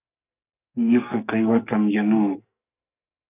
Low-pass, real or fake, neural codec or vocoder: 3.6 kHz; fake; codec, 44.1 kHz, 2.6 kbps, SNAC